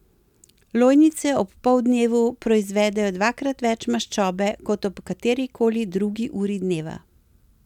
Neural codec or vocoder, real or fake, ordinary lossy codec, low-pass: none; real; none; 19.8 kHz